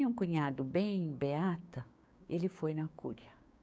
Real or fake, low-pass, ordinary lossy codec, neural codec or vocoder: fake; none; none; codec, 16 kHz, 6 kbps, DAC